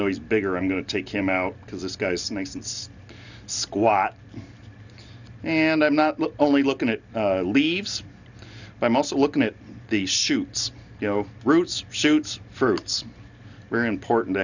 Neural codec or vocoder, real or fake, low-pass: none; real; 7.2 kHz